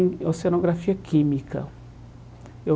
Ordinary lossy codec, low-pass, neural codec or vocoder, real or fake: none; none; none; real